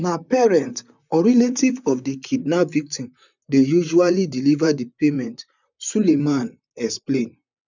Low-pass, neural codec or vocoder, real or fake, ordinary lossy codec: 7.2 kHz; vocoder, 44.1 kHz, 128 mel bands, Pupu-Vocoder; fake; none